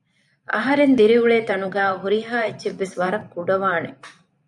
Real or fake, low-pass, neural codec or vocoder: fake; 10.8 kHz; vocoder, 44.1 kHz, 128 mel bands every 512 samples, BigVGAN v2